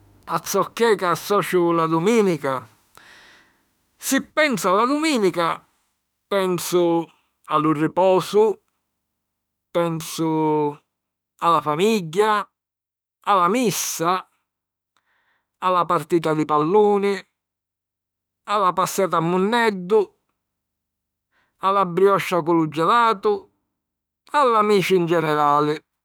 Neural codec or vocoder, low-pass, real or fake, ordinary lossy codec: autoencoder, 48 kHz, 32 numbers a frame, DAC-VAE, trained on Japanese speech; none; fake; none